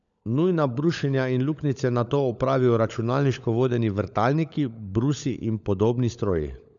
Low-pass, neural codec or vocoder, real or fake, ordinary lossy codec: 7.2 kHz; codec, 16 kHz, 16 kbps, FunCodec, trained on LibriTTS, 50 frames a second; fake; none